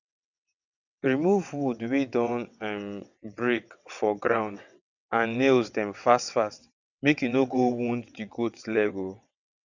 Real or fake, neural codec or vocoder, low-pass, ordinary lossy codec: fake; vocoder, 22.05 kHz, 80 mel bands, WaveNeXt; 7.2 kHz; none